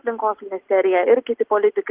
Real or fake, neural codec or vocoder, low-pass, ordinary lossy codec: real; none; 3.6 kHz; Opus, 16 kbps